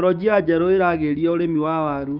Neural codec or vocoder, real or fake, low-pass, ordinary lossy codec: codec, 16 kHz, 6 kbps, DAC; fake; 5.4 kHz; none